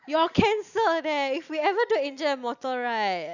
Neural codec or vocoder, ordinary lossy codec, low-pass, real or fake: none; none; 7.2 kHz; real